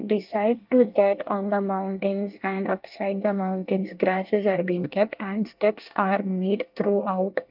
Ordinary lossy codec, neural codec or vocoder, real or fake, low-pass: Opus, 24 kbps; codec, 24 kHz, 1 kbps, SNAC; fake; 5.4 kHz